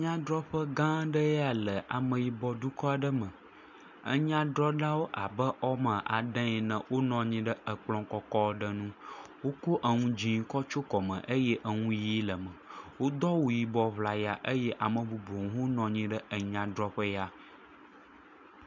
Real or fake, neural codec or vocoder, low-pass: real; none; 7.2 kHz